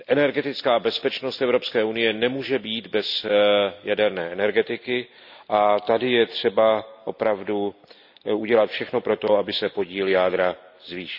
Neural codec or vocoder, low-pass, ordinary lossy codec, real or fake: none; 5.4 kHz; none; real